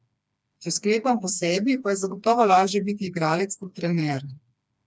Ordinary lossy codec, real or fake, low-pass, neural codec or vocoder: none; fake; none; codec, 16 kHz, 2 kbps, FreqCodec, smaller model